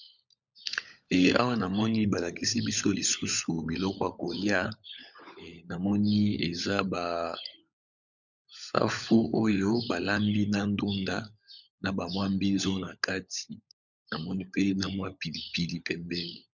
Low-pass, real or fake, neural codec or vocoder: 7.2 kHz; fake; codec, 16 kHz, 16 kbps, FunCodec, trained on LibriTTS, 50 frames a second